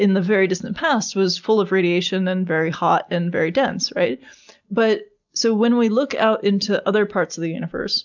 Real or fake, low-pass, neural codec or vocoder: real; 7.2 kHz; none